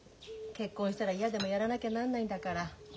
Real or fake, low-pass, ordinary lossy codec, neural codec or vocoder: real; none; none; none